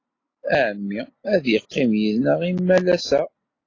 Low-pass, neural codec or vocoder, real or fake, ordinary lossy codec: 7.2 kHz; none; real; AAC, 32 kbps